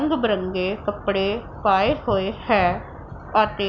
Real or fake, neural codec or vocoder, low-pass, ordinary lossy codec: real; none; 7.2 kHz; none